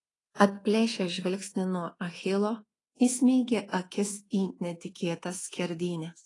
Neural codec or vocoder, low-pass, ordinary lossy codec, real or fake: codec, 24 kHz, 1.2 kbps, DualCodec; 10.8 kHz; AAC, 32 kbps; fake